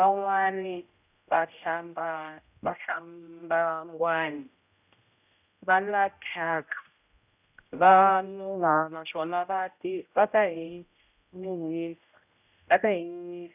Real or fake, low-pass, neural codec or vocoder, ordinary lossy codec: fake; 3.6 kHz; codec, 16 kHz, 0.5 kbps, X-Codec, HuBERT features, trained on general audio; none